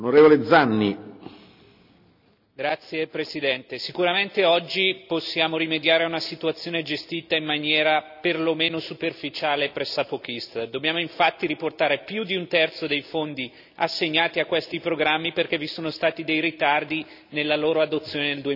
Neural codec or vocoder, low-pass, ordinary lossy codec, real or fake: none; 5.4 kHz; none; real